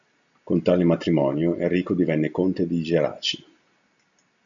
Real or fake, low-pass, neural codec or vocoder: real; 7.2 kHz; none